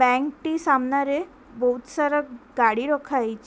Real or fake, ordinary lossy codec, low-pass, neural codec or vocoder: real; none; none; none